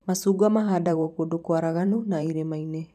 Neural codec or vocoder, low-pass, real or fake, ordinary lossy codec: vocoder, 44.1 kHz, 128 mel bands every 512 samples, BigVGAN v2; 14.4 kHz; fake; none